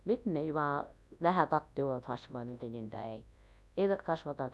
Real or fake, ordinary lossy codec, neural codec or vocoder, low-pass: fake; none; codec, 24 kHz, 0.9 kbps, WavTokenizer, large speech release; none